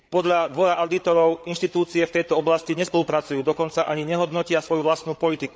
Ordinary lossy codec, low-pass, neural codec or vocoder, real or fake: none; none; codec, 16 kHz, 8 kbps, FreqCodec, larger model; fake